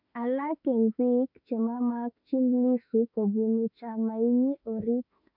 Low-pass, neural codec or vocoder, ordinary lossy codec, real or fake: 5.4 kHz; autoencoder, 48 kHz, 32 numbers a frame, DAC-VAE, trained on Japanese speech; none; fake